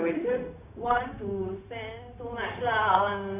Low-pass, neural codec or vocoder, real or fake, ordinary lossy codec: 3.6 kHz; codec, 16 kHz, 0.4 kbps, LongCat-Audio-Codec; fake; MP3, 32 kbps